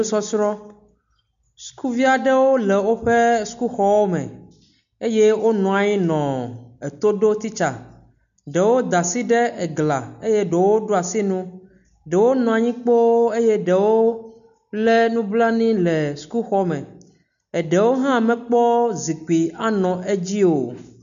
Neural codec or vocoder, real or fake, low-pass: none; real; 7.2 kHz